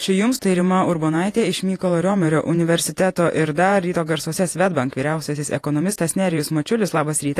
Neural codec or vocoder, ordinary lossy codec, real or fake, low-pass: vocoder, 48 kHz, 128 mel bands, Vocos; AAC, 48 kbps; fake; 14.4 kHz